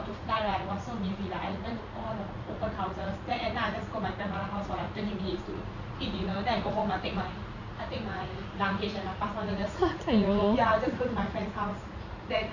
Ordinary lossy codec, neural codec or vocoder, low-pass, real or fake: none; vocoder, 44.1 kHz, 80 mel bands, Vocos; 7.2 kHz; fake